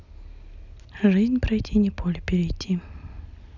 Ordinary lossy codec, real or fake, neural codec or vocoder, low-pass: none; real; none; 7.2 kHz